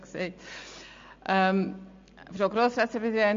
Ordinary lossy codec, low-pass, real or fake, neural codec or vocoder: MP3, 48 kbps; 7.2 kHz; real; none